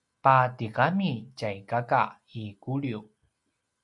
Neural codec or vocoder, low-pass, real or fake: none; 10.8 kHz; real